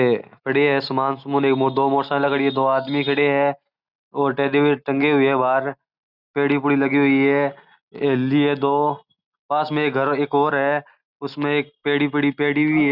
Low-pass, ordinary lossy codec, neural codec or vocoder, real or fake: 5.4 kHz; Opus, 64 kbps; none; real